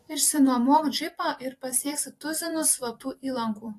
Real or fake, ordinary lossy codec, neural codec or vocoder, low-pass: fake; AAC, 48 kbps; vocoder, 44.1 kHz, 128 mel bands every 256 samples, BigVGAN v2; 14.4 kHz